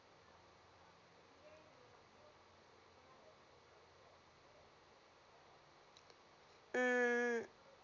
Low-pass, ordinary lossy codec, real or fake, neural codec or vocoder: 7.2 kHz; none; real; none